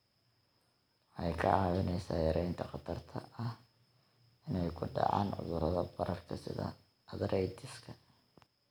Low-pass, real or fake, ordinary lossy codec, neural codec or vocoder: none; real; none; none